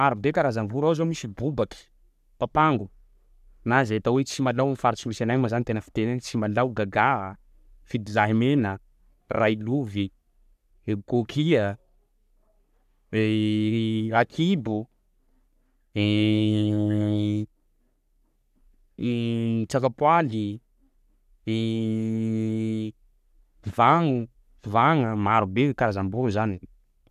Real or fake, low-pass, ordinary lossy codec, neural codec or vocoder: fake; 14.4 kHz; none; codec, 44.1 kHz, 3.4 kbps, Pupu-Codec